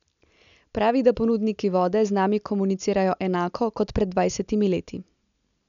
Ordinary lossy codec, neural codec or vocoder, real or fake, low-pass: none; none; real; 7.2 kHz